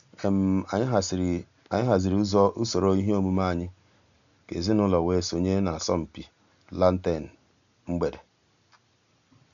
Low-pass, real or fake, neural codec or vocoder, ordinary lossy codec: 7.2 kHz; real; none; none